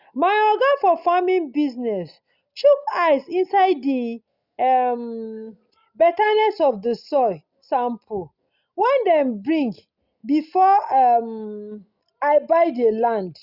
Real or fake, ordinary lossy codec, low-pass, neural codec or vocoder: real; Opus, 64 kbps; 5.4 kHz; none